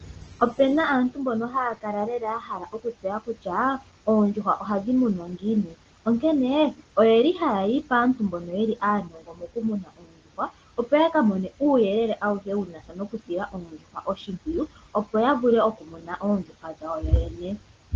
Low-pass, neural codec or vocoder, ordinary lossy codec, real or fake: 7.2 kHz; none; Opus, 16 kbps; real